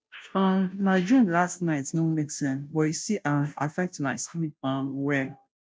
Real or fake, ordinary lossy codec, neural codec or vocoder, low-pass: fake; none; codec, 16 kHz, 0.5 kbps, FunCodec, trained on Chinese and English, 25 frames a second; none